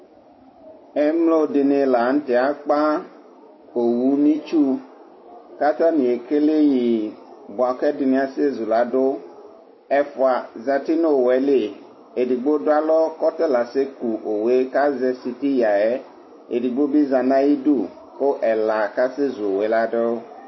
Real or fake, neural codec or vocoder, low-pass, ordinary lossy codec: real; none; 7.2 kHz; MP3, 24 kbps